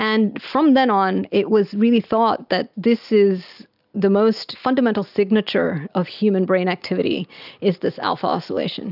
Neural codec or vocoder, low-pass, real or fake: none; 5.4 kHz; real